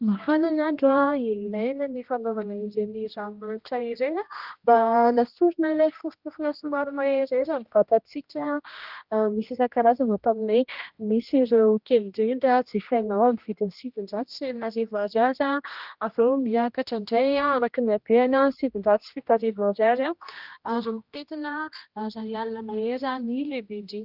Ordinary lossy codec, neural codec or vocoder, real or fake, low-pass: Opus, 32 kbps; codec, 16 kHz, 1 kbps, X-Codec, HuBERT features, trained on general audio; fake; 5.4 kHz